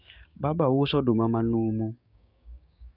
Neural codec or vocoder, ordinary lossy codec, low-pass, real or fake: codec, 16 kHz, 6 kbps, DAC; none; 5.4 kHz; fake